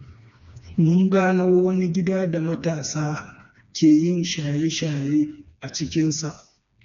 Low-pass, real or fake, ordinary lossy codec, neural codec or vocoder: 7.2 kHz; fake; none; codec, 16 kHz, 2 kbps, FreqCodec, smaller model